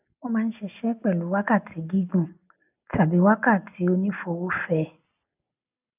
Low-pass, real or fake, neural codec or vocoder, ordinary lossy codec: 3.6 kHz; real; none; none